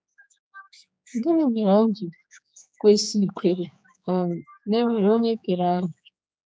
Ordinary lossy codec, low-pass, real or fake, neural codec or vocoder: none; none; fake; codec, 16 kHz, 4 kbps, X-Codec, HuBERT features, trained on general audio